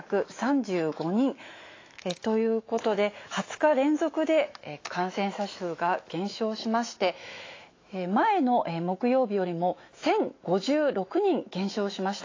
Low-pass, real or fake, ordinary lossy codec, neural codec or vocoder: 7.2 kHz; fake; AAC, 32 kbps; autoencoder, 48 kHz, 128 numbers a frame, DAC-VAE, trained on Japanese speech